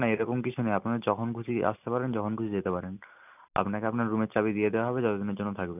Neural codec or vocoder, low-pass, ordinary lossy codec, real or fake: none; 3.6 kHz; none; real